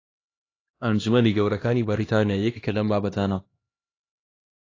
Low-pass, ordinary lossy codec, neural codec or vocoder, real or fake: 7.2 kHz; AAC, 32 kbps; codec, 16 kHz, 1 kbps, X-Codec, HuBERT features, trained on LibriSpeech; fake